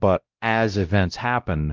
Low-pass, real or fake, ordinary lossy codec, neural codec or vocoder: 7.2 kHz; fake; Opus, 32 kbps; codec, 16 kHz, 0.5 kbps, X-Codec, WavLM features, trained on Multilingual LibriSpeech